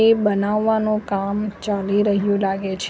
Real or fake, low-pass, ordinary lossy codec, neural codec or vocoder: real; none; none; none